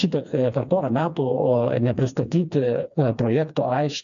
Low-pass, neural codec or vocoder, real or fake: 7.2 kHz; codec, 16 kHz, 2 kbps, FreqCodec, smaller model; fake